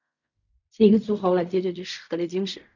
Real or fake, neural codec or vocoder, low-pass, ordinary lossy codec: fake; codec, 16 kHz in and 24 kHz out, 0.4 kbps, LongCat-Audio-Codec, fine tuned four codebook decoder; 7.2 kHz; none